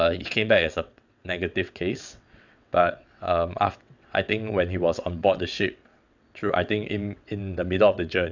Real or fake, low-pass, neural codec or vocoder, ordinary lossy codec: fake; 7.2 kHz; vocoder, 22.05 kHz, 80 mel bands, WaveNeXt; none